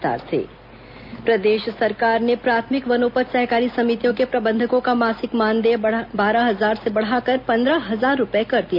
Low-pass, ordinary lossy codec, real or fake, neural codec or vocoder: 5.4 kHz; none; real; none